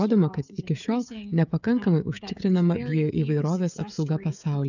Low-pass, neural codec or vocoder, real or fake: 7.2 kHz; codec, 16 kHz, 16 kbps, FreqCodec, smaller model; fake